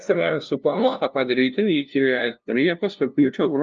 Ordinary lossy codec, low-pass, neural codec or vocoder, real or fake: Opus, 24 kbps; 7.2 kHz; codec, 16 kHz, 0.5 kbps, FunCodec, trained on LibriTTS, 25 frames a second; fake